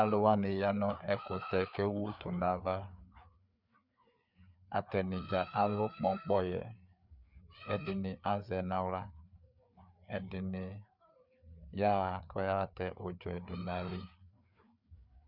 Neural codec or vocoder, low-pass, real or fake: codec, 16 kHz, 4 kbps, FreqCodec, larger model; 5.4 kHz; fake